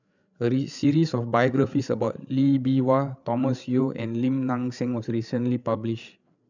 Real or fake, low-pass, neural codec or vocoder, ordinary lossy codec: fake; 7.2 kHz; codec, 16 kHz, 8 kbps, FreqCodec, larger model; none